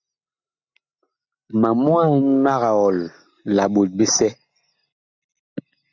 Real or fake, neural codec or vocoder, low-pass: real; none; 7.2 kHz